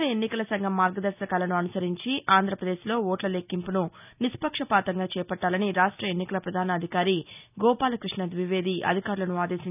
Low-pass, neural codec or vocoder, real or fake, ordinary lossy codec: 3.6 kHz; none; real; none